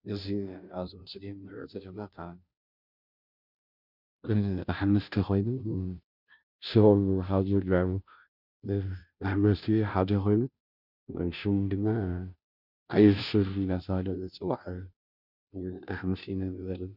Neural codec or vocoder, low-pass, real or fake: codec, 16 kHz, 0.5 kbps, FunCodec, trained on Chinese and English, 25 frames a second; 5.4 kHz; fake